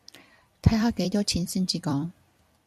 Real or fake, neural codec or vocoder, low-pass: fake; vocoder, 44.1 kHz, 128 mel bands every 256 samples, BigVGAN v2; 14.4 kHz